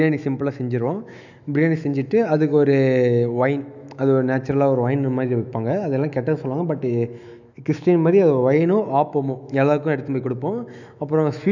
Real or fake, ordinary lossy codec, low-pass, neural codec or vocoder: fake; none; 7.2 kHz; autoencoder, 48 kHz, 128 numbers a frame, DAC-VAE, trained on Japanese speech